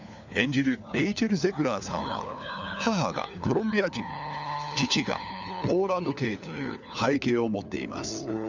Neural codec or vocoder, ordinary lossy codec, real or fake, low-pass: codec, 16 kHz, 4 kbps, FunCodec, trained on LibriTTS, 50 frames a second; none; fake; 7.2 kHz